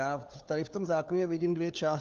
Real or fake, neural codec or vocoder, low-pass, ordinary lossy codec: fake; codec, 16 kHz, 4 kbps, FunCodec, trained on LibriTTS, 50 frames a second; 7.2 kHz; Opus, 32 kbps